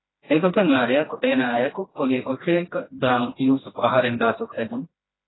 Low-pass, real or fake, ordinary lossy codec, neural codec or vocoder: 7.2 kHz; fake; AAC, 16 kbps; codec, 16 kHz, 1 kbps, FreqCodec, smaller model